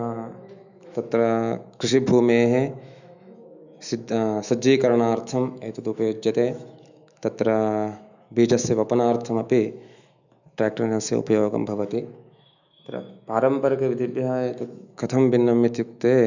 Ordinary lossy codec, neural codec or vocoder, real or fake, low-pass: none; autoencoder, 48 kHz, 128 numbers a frame, DAC-VAE, trained on Japanese speech; fake; 7.2 kHz